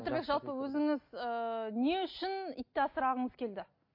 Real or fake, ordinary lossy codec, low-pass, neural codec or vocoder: real; MP3, 32 kbps; 5.4 kHz; none